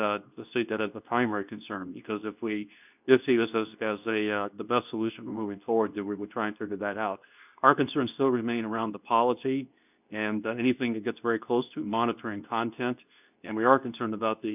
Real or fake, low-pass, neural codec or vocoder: fake; 3.6 kHz; codec, 24 kHz, 0.9 kbps, WavTokenizer, medium speech release version 2